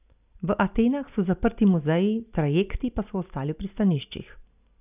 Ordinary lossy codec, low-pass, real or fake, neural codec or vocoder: none; 3.6 kHz; real; none